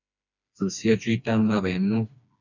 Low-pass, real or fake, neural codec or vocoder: 7.2 kHz; fake; codec, 16 kHz, 2 kbps, FreqCodec, smaller model